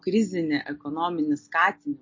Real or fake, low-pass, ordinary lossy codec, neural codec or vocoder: real; 7.2 kHz; MP3, 32 kbps; none